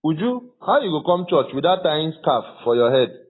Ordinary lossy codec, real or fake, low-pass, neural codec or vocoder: AAC, 16 kbps; real; 7.2 kHz; none